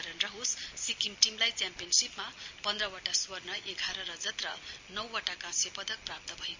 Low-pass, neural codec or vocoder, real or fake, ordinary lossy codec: 7.2 kHz; none; real; MP3, 64 kbps